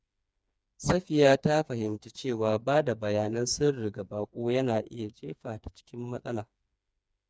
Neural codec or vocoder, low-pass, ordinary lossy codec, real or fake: codec, 16 kHz, 4 kbps, FreqCodec, smaller model; none; none; fake